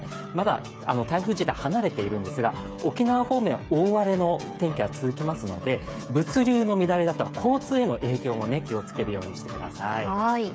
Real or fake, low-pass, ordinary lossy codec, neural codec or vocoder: fake; none; none; codec, 16 kHz, 8 kbps, FreqCodec, smaller model